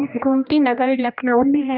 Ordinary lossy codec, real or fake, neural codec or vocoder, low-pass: none; fake; codec, 16 kHz, 1 kbps, X-Codec, HuBERT features, trained on general audio; 5.4 kHz